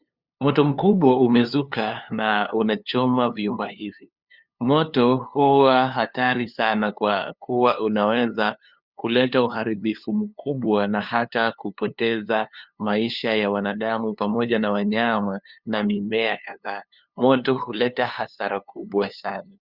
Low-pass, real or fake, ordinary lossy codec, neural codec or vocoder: 5.4 kHz; fake; Opus, 64 kbps; codec, 16 kHz, 2 kbps, FunCodec, trained on LibriTTS, 25 frames a second